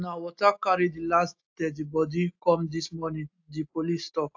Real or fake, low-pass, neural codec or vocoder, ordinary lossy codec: real; 7.2 kHz; none; AAC, 48 kbps